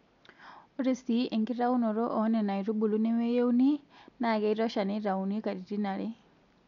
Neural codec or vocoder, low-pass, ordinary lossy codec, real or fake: none; 7.2 kHz; none; real